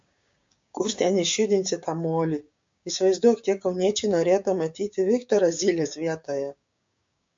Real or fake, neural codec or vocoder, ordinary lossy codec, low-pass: fake; codec, 16 kHz, 6 kbps, DAC; MP3, 48 kbps; 7.2 kHz